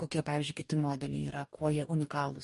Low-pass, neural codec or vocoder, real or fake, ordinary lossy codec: 14.4 kHz; codec, 44.1 kHz, 2.6 kbps, DAC; fake; MP3, 48 kbps